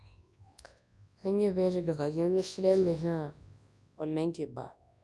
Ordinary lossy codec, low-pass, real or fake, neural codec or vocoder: none; none; fake; codec, 24 kHz, 0.9 kbps, WavTokenizer, large speech release